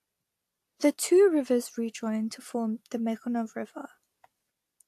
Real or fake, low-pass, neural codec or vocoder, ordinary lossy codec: real; 14.4 kHz; none; AAC, 64 kbps